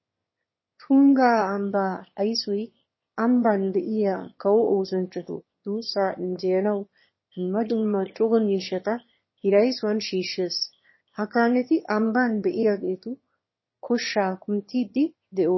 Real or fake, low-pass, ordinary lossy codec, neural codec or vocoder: fake; 7.2 kHz; MP3, 24 kbps; autoencoder, 22.05 kHz, a latent of 192 numbers a frame, VITS, trained on one speaker